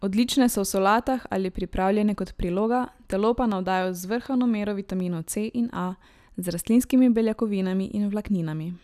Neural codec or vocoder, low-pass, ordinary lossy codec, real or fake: none; 14.4 kHz; none; real